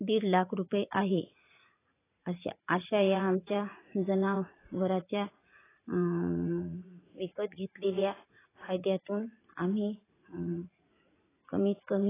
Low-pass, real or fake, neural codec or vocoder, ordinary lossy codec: 3.6 kHz; fake; vocoder, 44.1 kHz, 80 mel bands, Vocos; AAC, 16 kbps